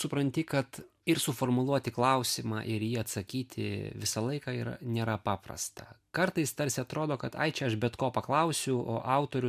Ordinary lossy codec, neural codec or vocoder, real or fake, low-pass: MP3, 96 kbps; none; real; 14.4 kHz